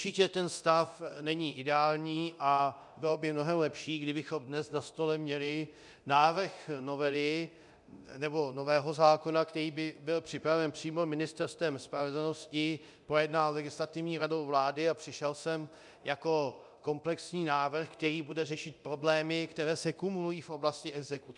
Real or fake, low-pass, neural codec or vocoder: fake; 10.8 kHz; codec, 24 kHz, 0.9 kbps, DualCodec